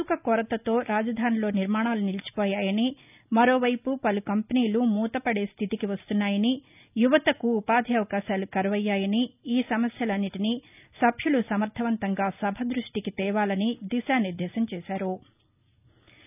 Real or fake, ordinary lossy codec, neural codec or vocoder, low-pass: real; none; none; 3.6 kHz